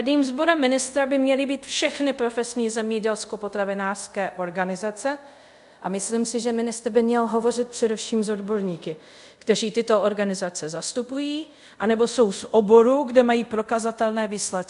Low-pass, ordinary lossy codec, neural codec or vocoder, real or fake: 10.8 kHz; MP3, 64 kbps; codec, 24 kHz, 0.5 kbps, DualCodec; fake